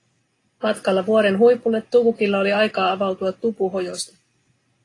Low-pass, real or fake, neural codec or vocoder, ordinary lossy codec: 10.8 kHz; real; none; AAC, 32 kbps